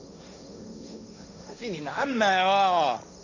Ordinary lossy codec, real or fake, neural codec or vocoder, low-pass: none; fake; codec, 16 kHz, 1.1 kbps, Voila-Tokenizer; 7.2 kHz